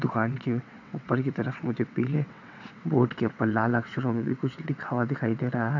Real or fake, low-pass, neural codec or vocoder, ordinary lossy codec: fake; 7.2 kHz; vocoder, 22.05 kHz, 80 mel bands, WaveNeXt; none